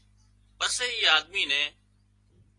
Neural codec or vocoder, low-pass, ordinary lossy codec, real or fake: none; 10.8 kHz; AAC, 32 kbps; real